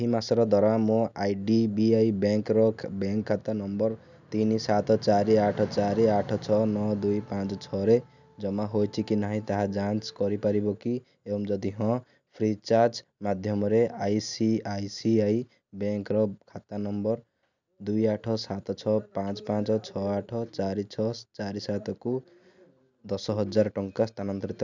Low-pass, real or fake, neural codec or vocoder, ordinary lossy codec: 7.2 kHz; real; none; none